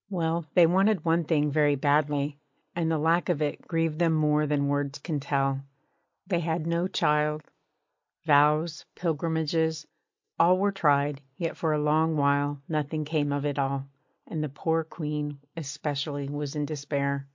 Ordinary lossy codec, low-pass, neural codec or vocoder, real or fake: MP3, 48 kbps; 7.2 kHz; none; real